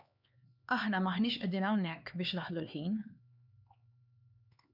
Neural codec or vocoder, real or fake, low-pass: codec, 16 kHz, 4 kbps, X-Codec, HuBERT features, trained on LibriSpeech; fake; 5.4 kHz